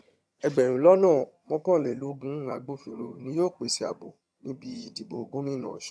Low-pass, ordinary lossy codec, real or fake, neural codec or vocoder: none; none; fake; vocoder, 22.05 kHz, 80 mel bands, HiFi-GAN